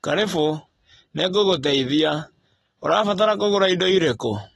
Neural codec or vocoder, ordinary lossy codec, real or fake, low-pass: none; AAC, 32 kbps; real; 19.8 kHz